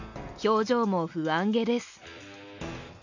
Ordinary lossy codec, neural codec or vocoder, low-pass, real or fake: none; none; 7.2 kHz; real